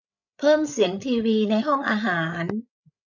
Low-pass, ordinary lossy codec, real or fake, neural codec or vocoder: 7.2 kHz; none; fake; codec, 16 kHz, 8 kbps, FreqCodec, larger model